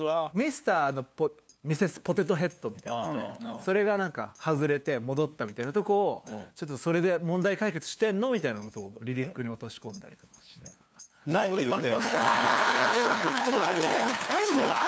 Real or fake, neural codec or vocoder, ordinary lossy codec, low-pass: fake; codec, 16 kHz, 2 kbps, FunCodec, trained on LibriTTS, 25 frames a second; none; none